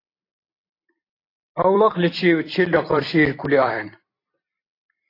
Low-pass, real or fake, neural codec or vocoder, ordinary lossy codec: 5.4 kHz; fake; vocoder, 44.1 kHz, 128 mel bands every 256 samples, BigVGAN v2; AAC, 32 kbps